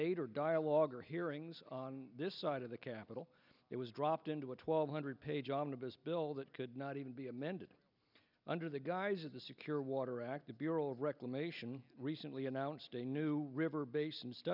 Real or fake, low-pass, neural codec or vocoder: real; 5.4 kHz; none